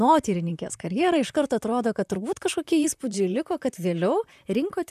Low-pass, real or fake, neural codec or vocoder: 14.4 kHz; fake; vocoder, 44.1 kHz, 128 mel bands, Pupu-Vocoder